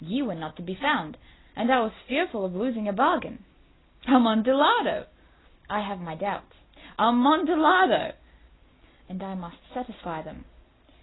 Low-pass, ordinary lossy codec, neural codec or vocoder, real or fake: 7.2 kHz; AAC, 16 kbps; none; real